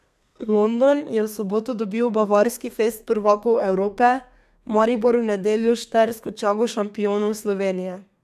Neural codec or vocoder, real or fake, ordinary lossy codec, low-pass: codec, 32 kHz, 1.9 kbps, SNAC; fake; none; 14.4 kHz